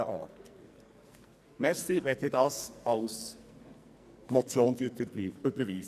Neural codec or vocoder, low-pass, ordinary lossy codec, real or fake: codec, 44.1 kHz, 2.6 kbps, SNAC; 14.4 kHz; none; fake